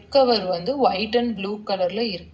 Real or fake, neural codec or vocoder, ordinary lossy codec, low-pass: real; none; none; none